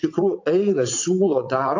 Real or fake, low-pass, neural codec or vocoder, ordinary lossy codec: fake; 7.2 kHz; vocoder, 22.05 kHz, 80 mel bands, WaveNeXt; AAC, 48 kbps